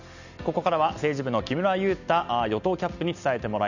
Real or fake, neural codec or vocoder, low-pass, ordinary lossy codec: real; none; 7.2 kHz; none